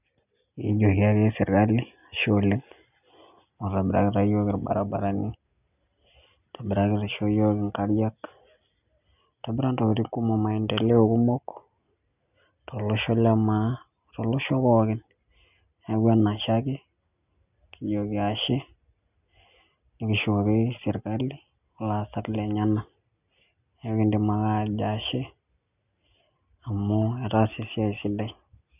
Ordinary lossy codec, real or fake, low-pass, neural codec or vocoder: none; real; 3.6 kHz; none